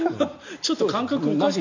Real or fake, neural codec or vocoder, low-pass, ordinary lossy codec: real; none; 7.2 kHz; AAC, 48 kbps